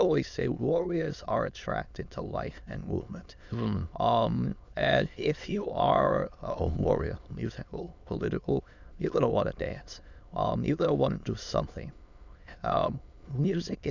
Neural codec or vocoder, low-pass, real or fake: autoencoder, 22.05 kHz, a latent of 192 numbers a frame, VITS, trained on many speakers; 7.2 kHz; fake